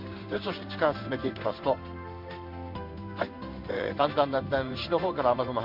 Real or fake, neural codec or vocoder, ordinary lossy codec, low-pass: fake; codec, 16 kHz in and 24 kHz out, 1 kbps, XY-Tokenizer; MP3, 48 kbps; 5.4 kHz